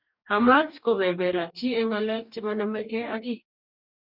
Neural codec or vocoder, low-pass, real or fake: codec, 44.1 kHz, 2.6 kbps, DAC; 5.4 kHz; fake